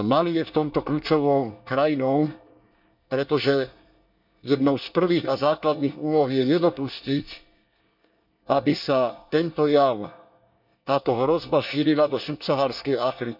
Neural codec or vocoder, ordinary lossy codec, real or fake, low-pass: codec, 24 kHz, 1 kbps, SNAC; none; fake; 5.4 kHz